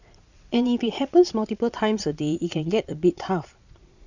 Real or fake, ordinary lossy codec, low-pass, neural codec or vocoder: fake; none; 7.2 kHz; vocoder, 22.05 kHz, 80 mel bands, Vocos